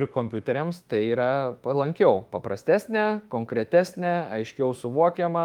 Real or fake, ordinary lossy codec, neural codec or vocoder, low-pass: fake; Opus, 32 kbps; autoencoder, 48 kHz, 32 numbers a frame, DAC-VAE, trained on Japanese speech; 19.8 kHz